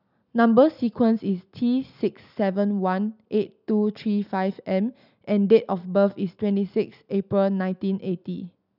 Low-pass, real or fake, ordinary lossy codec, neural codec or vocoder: 5.4 kHz; real; none; none